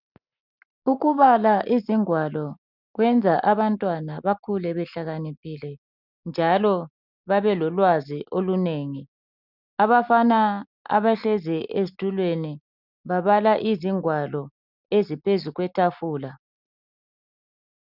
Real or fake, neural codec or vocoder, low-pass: real; none; 5.4 kHz